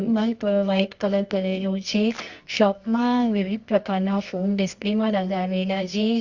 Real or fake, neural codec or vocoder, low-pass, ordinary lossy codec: fake; codec, 24 kHz, 0.9 kbps, WavTokenizer, medium music audio release; 7.2 kHz; Opus, 64 kbps